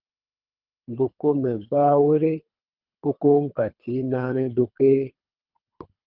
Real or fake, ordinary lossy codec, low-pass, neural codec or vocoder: fake; Opus, 24 kbps; 5.4 kHz; codec, 24 kHz, 3 kbps, HILCodec